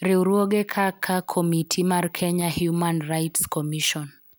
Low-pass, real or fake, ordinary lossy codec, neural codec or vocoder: none; real; none; none